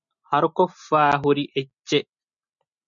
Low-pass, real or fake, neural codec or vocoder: 7.2 kHz; real; none